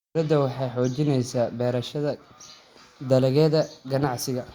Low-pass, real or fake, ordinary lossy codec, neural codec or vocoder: 19.8 kHz; real; Opus, 64 kbps; none